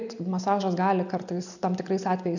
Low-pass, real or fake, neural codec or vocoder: 7.2 kHz; real; none